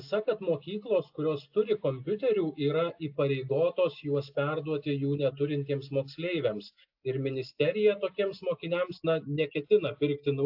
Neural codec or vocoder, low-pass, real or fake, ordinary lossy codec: none; 5.4 kHz; real; AAC, 48 kbps